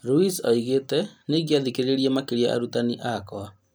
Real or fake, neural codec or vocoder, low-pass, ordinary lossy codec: real; none; none; none